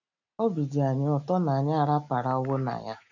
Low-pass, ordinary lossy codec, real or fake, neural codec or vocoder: 7.2 kHz; none; real; none